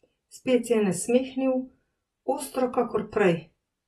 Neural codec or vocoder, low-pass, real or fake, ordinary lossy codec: none; 19.8 kHz; real; AAC, 32 kbps